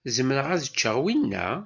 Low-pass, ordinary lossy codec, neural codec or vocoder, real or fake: 7.2 kHz; MP3, 64 kbps; none; real